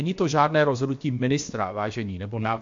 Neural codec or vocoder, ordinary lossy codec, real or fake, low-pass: codec, 16 kHz, about 1 kbps, DyCAST, with the encoder's durations; MP3, 48 kbps; fake; 7.2 kHz